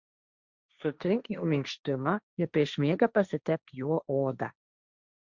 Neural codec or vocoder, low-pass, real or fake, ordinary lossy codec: codec, 16 kHz, 1.1 kbps, Voila-Tokenizer; 7.2 kHz; fake; Opus, 64 kbps